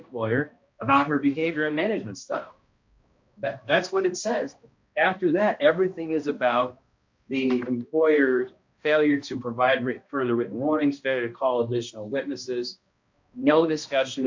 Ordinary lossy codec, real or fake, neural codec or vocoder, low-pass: MP3, 48 kbps; fake; codec, 16 kHz, 1 kbps, X-Codec, HuBERT features, trained on balanced general audio; 7.2 kHz